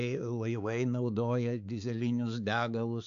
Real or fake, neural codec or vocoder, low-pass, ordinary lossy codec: fake; codec, 16 kHz, 4 kbps, X-Codec, HuBERT features, trained on balanced general audio; 7.2 kHz; AAC, 96 kbps